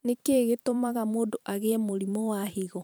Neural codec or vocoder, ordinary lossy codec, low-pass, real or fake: vocoder, 44.1 kHz, 128 mel bands every 512 samples, BigVGAN v2; none; none; fake